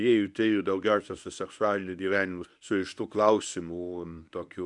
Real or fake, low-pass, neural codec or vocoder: fake; 10.8 kHz; codec, 24 kHz, 0.9 kbps, WavTokenizer, medium speech release version 1